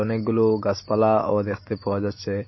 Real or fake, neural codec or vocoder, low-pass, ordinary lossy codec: real; none; 7.2 kHz; MP3, 24 kbps